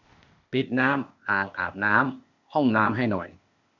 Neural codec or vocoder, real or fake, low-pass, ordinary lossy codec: codec, 16 kHz, 0.8 kbps, ZipCodec; fake; 7.2 kHz; none